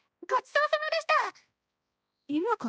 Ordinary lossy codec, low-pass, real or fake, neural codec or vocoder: none; none; fake; codec, 16 kHz, 1 kbps, X-Codec, HuBERT features, trained on balanced general audio